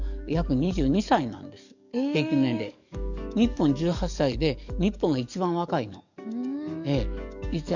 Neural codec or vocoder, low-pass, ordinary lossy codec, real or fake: codec, 44.1 kHz, 7.8 kbps, DAC; 7.2 kHz; none; fake